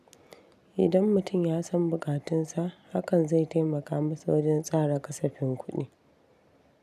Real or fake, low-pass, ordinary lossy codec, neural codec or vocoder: real; 14.4 kHz; none; none